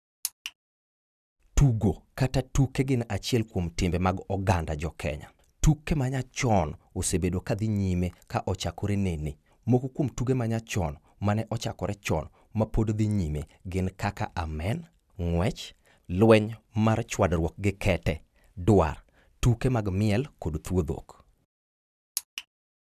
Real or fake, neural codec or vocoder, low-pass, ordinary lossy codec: real; none; 14.4 kHz; none